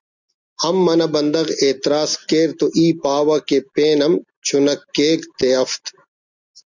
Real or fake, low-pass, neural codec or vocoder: real; 7.2 kHz; none